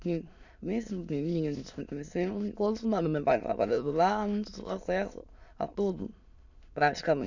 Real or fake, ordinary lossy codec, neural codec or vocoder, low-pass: fake; none; autoencoder, 22.05 kHz, a latent of 192 numbers a frame, VITS, trained on many speakers; 7.2 kHz